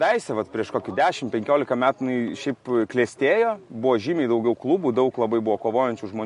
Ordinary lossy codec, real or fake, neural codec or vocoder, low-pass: MP3, 48 kbps; real; none; 14.4 kHz